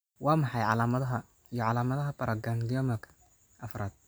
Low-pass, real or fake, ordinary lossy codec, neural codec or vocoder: none; real; none; none